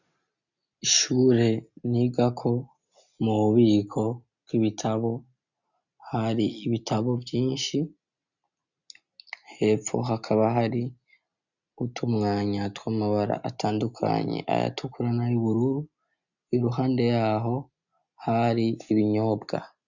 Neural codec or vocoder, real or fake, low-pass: none; real; 7.2 kHz